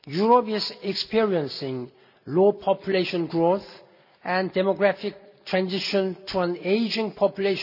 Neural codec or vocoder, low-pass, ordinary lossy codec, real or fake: none; 5.4 kHz; none; real